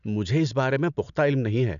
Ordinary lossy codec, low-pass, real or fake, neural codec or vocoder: none; 7.2 kHz; real; none